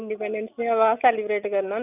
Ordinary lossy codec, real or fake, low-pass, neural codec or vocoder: none; real; 3.6 kHz; none